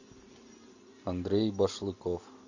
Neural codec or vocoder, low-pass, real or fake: none; 7.2 kHz; real